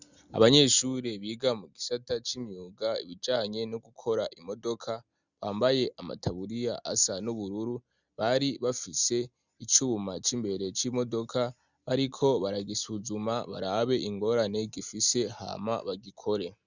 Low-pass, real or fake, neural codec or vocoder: 7.2 kHz; real; none